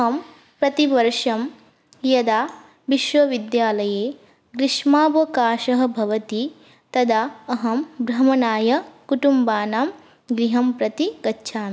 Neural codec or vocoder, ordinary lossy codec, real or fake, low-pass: none; none; real; none